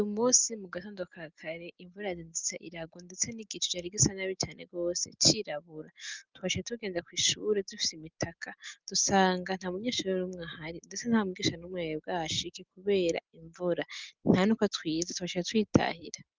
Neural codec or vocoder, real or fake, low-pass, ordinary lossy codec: none; real; 7.2 kHz; Opus, 32 kbps